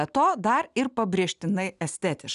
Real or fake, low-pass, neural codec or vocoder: real; 10.8 kHz; none